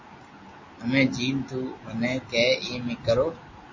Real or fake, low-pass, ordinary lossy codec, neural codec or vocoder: real; 7.2 kHz; MP3, 32 kbps; none